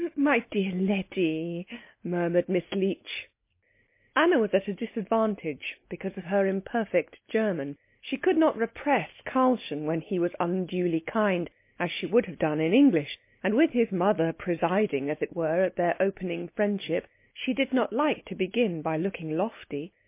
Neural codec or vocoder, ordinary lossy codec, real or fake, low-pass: none; MP3, 24 kbps; real; 3.6 kHz